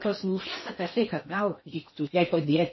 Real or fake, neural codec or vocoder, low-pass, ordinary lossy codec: fake; codec, 16 kHz in and 24 kHz out, 0.6 kbps, FocalCodec, streaming, 4096 codes; 7.2 kHz; MP3, 24 kbps